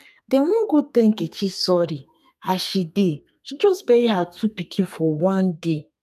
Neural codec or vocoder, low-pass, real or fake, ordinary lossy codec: codec, 32 kHz, 1.9 kbps, SNAC; 14.4 kHz; fake; none